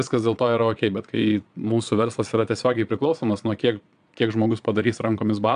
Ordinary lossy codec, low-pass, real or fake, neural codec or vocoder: MP3, 96 kbps; 9.9 kHz; fake; vocoder, 22.05 kHz, 80 mel bands, Vocos